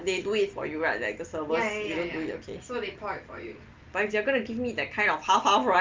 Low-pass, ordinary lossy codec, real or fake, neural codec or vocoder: 7.2 kHz; Opus, 32 kbps; real; none